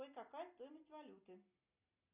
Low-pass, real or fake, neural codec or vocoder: 3.6 kHz; real; none